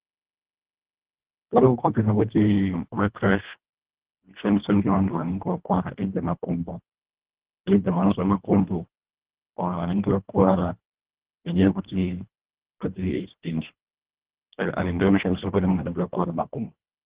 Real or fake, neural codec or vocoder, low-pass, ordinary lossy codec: fake; codec, 24 kHz, 1.5 kbps, HILCodec; 3.6 kHz; Opus, 16 kbps